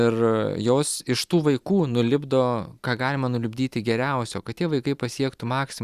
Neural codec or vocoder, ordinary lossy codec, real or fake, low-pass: none; Opus, 64 kbps; real; 14.4 kHz